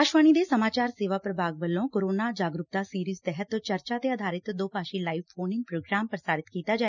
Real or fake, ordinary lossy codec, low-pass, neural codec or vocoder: real; none; 7.2 kHz; none